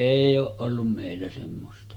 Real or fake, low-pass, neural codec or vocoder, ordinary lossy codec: fake; 19.8 kHz; autoencoder, 48 kHz, 128 numbers a frame, DAC-VAE, trained on Japanese speech; Opus, 24 kbps